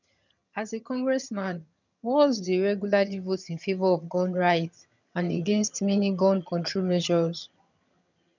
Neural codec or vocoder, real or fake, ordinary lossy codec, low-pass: vocoder, 22.05 kHz, 80 mel bands, HiFi-GAN; fake; none; 7.2 kHz